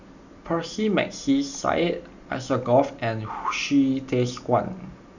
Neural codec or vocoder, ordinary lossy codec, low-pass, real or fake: none; none; 7.2 kHz; real